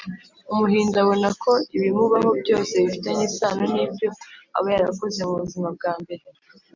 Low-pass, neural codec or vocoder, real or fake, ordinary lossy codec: 7.2 kHz; none; real; MP3, 64 kbps